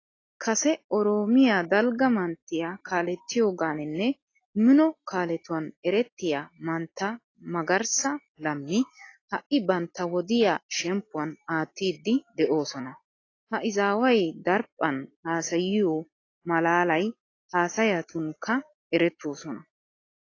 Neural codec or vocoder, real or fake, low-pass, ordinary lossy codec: none; real; 7.2 kHz; AAC, 32 kbps